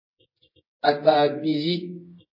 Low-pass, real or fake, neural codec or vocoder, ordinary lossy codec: 5.4 kHz; fake; codec, 24 kHz, 0.9 kbps, WavTokenizer, medium music audio release; MP3, 24 kbps